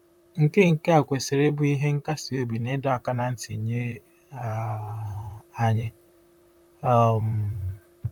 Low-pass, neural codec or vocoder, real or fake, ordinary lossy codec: 19.8 kHz; vocoder, 44.1 kHz, 128 mel bands, Pupu-Vocoder; fake; none